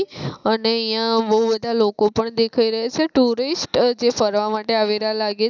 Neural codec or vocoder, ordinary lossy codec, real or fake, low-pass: none; none; real; 7.2 kHz